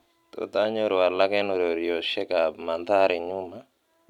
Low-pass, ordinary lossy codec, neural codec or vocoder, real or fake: 19.8 kHz; none; none; real